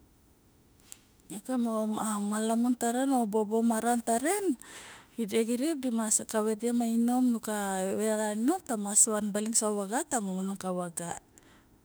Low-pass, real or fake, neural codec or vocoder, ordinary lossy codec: none; fake; autoencoder, 48 kHz, 32 numbers a frame, DAC-VAE, trained on Japanese speech; none